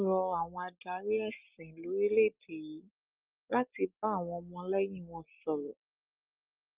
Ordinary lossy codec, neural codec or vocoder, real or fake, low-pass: Opus, 32 kbps; none; real; 3.6 kHz